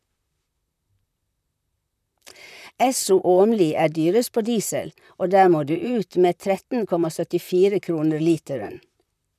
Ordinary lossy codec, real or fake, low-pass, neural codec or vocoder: none; fake; 14.4 kHz; vocoder, 44.1 kHz, 128 mel bands, Pupu-Vocoder